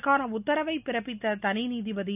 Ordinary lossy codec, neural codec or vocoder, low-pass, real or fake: none; none; 3.6 kHz; real